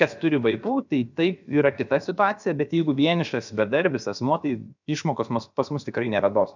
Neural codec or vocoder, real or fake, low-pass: codec, 16 kHz, about 1 kbps, DyCAST, with the encoder's durations; fake; 7.2 kHz